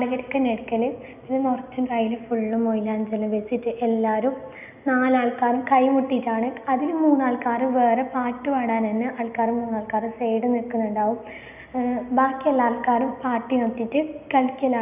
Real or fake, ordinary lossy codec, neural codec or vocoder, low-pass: real; none; none; 3.6 kHz